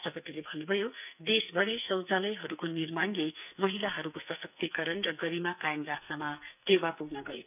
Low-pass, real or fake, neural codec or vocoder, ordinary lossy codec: 3.6 kHz; fake; codec, 44.1 kHz, 2.6 kbps, SNAC; none